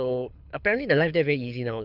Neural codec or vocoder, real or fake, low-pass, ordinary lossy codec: codec, 24 kHz, 6 kbps, HILCodec; fake; 5.4 kHz; none